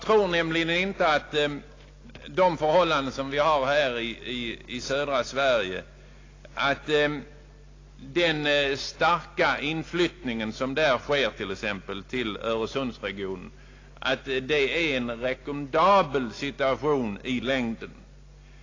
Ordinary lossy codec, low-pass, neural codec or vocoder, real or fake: AAC, 32 kbps; 7.2 kHz; none; real